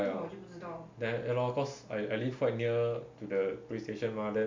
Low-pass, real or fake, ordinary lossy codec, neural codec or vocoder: 7.2 kHz; real; MP3, 48 kbps; none